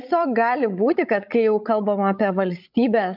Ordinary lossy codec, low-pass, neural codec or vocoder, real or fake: MP3, 48 kbps; 5.4 kHz; codec, 16 kHz, 16 kbps, FreqCodec, larger model; fake